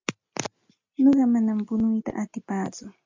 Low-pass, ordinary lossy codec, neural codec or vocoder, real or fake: 7.2 kHz; MP3, 64 kbps; none; real